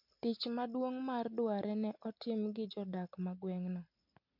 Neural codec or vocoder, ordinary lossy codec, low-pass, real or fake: none; MP3, 48 kbps; 5.4 kHz; real